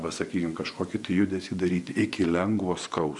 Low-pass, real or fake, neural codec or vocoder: 10.8 kHz; fake; vocoder, 24 kHz, 100 mel bands, Vocos